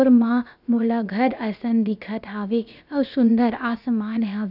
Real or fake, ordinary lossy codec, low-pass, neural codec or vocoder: fake; none; 5.4 kHz; codec, 16 kHz, about 1 kbps, DyCAST, with the encoder's durations